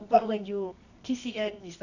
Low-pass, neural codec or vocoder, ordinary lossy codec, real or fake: 7.2 kHz; codec, 24 kHz, 0.9 kbps, WavTokenizer, medium music audio release; none; fake